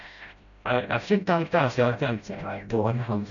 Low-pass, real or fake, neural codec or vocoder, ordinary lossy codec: 7.2 kHz; fake; codec, 16 kHz, 0.5 kbps, FreqCodec, smaller model; none